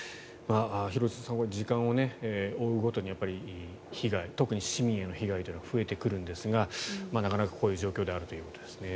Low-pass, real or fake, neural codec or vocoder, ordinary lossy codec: none; real; none; none